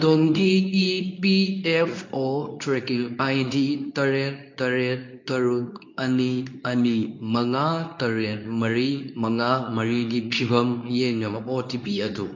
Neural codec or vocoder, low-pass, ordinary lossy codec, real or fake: codec, 24 kHz, 0.9 kbps, WavTokenizer, medium speech release version 2; 7.2 kHz; MP3, 48 kbps; fake